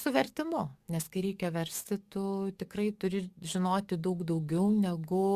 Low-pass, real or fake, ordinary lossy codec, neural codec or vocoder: 14.4 kHz; fake; Opus, 64 kbps; codec, 44.1 kHz, 7.8 kbps, DAC